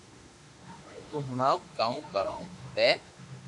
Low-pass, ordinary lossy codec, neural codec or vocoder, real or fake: 10.8 kHz; MP3, 64 kbps; autoencoder, 48 kHz, 32 numbers a frame, DAC-VAE, trained on Japanese speech; fake